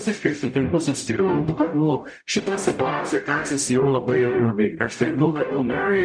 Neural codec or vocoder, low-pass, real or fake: codec, 44.1 kHz, 0.9 kbps, DAC; 9.9 kHz; fake